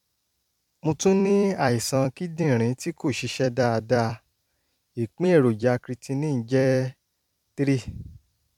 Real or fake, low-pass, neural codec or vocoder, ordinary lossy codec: fake; 19.8 kHz; vocoder, 44.1 kHz, 128 mel bands every 256 samples, BigVGAN v2; MP3, 96 kbps